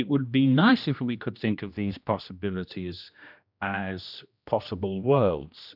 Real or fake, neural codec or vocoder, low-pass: fake; codec, 16 kHz, 1 kbps, X-Codec, HuBERT features, trained on general audio; 5.4 kHz